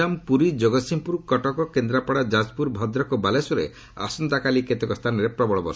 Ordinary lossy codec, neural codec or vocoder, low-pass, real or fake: none; none; none; real